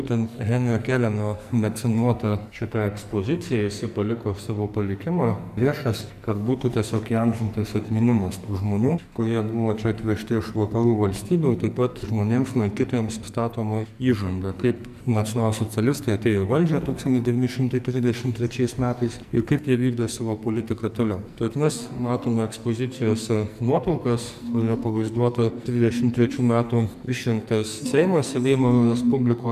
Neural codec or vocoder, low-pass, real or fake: codec, 44.1 kHz, 2.6 kbps, SNAC; 14.4 kHz; fake